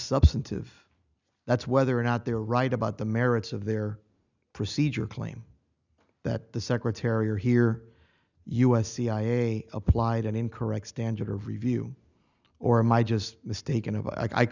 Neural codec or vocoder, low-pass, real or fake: none; 7.2 kHz; real